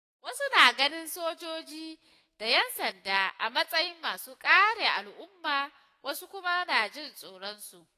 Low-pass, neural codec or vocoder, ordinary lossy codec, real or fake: 14.4 kHz; autoencoder, 48 kHz, 128 numbers a frame, DAC-VAE, trained on Japanese speech; AAC, 48 kbps; fake